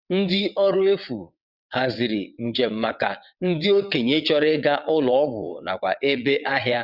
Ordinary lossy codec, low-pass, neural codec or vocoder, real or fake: Opus, 64 kbps; 5.4 kHz; vocoder, 22.05 kHz, 80 mel bands, WaveNeXt; fake